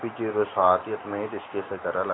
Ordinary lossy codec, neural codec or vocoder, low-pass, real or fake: AAC, 16 kbps; none; 7.2 kHz; real